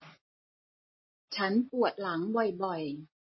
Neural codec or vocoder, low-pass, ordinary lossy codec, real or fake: none; 7.2 kHz; MP3, 24 kbps; real